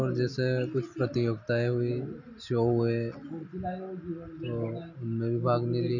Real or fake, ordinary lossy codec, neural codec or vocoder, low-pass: real; none; none; 7.2 kHz